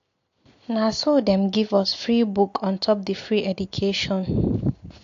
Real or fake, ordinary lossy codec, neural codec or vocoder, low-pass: real; AAC, 48 kbps; none; 7.2 kHz